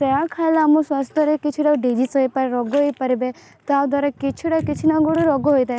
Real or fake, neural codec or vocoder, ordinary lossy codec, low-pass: real; none; none; none